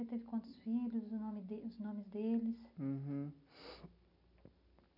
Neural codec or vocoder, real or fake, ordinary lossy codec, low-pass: none; real; none; 5.4 kHz